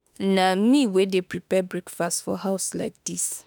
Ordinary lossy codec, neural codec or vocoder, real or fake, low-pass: none; autoencoder, 48 kHz, 32 numbers a frame, DAC-VAE, trained on Japanese speech; fake; none